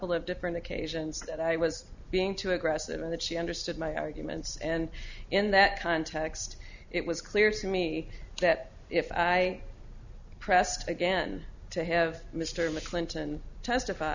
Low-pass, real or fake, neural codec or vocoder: 7.2 kHz; real; none